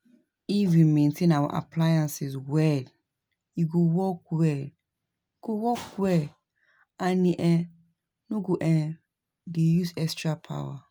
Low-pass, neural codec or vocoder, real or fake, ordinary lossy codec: none; none; real; none